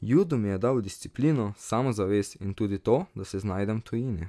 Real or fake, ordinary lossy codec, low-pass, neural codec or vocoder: real; none; none; none